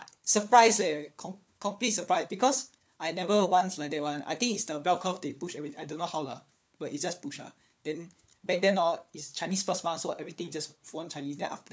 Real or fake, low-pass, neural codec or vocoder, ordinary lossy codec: fake; none; codec, 16 kHz, 4 kbps, FunCodec, trained on LibriTTS, 50 frames a second; none